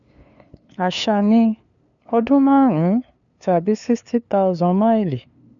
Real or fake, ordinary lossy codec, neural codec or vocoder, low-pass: fake; none; codec, 16 kHz, 2 kbps, FunCodec, trained on LibriTTS, 25 frames a second; 7.2 kHz